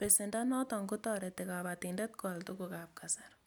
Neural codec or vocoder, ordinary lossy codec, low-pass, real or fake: none; none; none; real